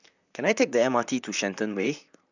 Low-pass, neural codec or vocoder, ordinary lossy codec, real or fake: 7.2 kHz; vocoder, 44.1 kHz, 128 mel bands, Pupu-Vocoder; none; fake